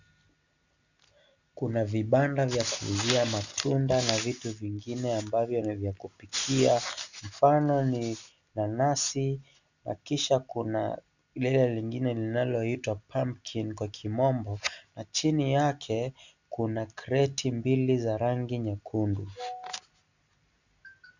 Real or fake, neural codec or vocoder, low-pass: real; none; 7.2 kHz